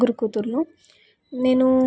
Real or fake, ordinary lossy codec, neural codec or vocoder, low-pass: real; none; none; none